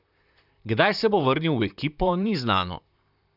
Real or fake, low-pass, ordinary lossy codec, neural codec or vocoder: fake; 5.4 kHz; none; vocoder, 44.1 kHz, 128 mel bands, Pupu-Vocoder